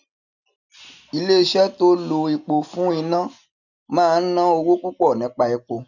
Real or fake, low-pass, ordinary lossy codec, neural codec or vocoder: real; 7.2 kHz; none; none